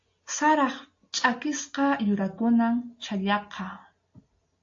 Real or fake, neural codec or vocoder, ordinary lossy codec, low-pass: real; none; AAC, 48 kbps; 7.2 kHz